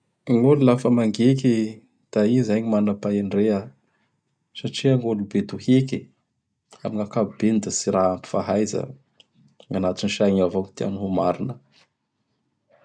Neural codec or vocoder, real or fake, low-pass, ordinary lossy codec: none; real; 9.9 kHz; none